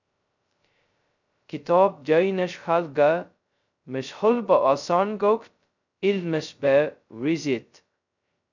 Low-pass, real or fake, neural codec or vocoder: 7.2 kHz; fake; codec, 16 kHz, 0.2 kbps, FocalCodec